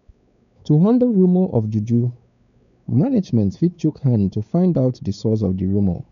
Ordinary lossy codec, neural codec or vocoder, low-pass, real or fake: none; codec, 16 kHz, 4 kbps, X-Codec, WavLM features, trained on Multilingual LibriSpeech; 7.2 kHz; fake